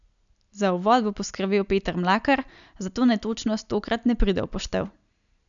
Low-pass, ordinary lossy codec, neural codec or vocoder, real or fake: 7.2 kHz; none; none; real